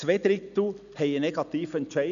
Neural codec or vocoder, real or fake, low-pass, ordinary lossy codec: none; real; 7.2 kHz; none